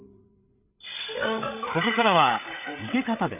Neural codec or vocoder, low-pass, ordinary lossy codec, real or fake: codec, 16 kHz, 8 kbps, FreqCodec, larger model; 3.6 kHz; none; fake